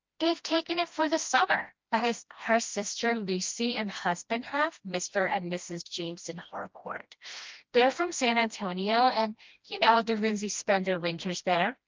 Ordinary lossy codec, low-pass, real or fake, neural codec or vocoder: Opus, 32 kbps; 7.2 kHz; fake; codec, 16 kHz, 1 kbps, FreqCodec, smaller model